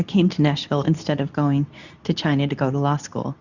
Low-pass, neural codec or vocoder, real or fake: 7.2 kHz; codec, 24 kHz, 0.9 kbps, WavTokenizer, medium speech release version 2; fake